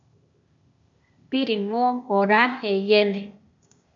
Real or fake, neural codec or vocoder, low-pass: fake; codec, 16 kHz, 0.8 kbps, ZipCodec; 7.2 kHz